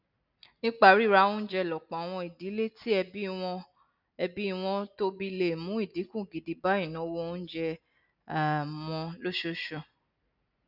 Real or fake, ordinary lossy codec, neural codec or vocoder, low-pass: real; none; none; 5.4 kHz